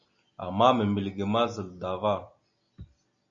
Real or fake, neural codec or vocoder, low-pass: real; none; 7.2 kHz